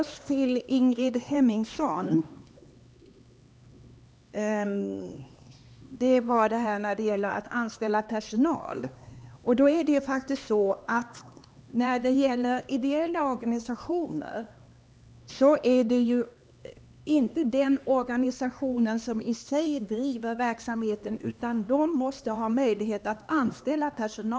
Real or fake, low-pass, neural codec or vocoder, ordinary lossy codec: fake; none; codec, 16 kHz, 2 kbps, X-Codec, HuBERT features, trained on LibriSpeech; none